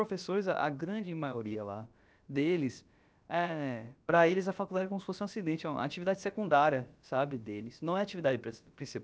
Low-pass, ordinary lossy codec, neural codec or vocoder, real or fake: none; none; codec, 16 kHz, about 1 kbps, DyCAST, with the encoder's durations; fake